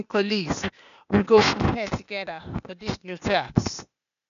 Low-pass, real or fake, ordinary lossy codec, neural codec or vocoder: 7.2 kHz; fake; none; codec, 16 kHz, 0.8 kbps, ZipCodec